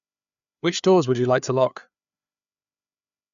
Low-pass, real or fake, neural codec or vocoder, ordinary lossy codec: 7.2 kHz; fake; codec, 16 kHz, 4 kbps, FreqCodec, larger model; none